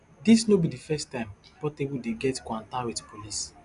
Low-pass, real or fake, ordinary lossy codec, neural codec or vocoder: 10.8 kHz; real; none; none